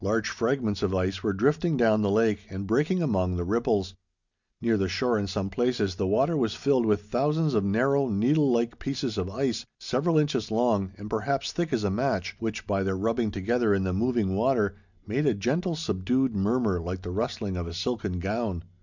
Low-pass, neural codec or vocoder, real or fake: 7.2 kHz; none; real